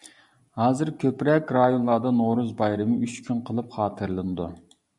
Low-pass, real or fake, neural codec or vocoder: 10.8 kHz; real; none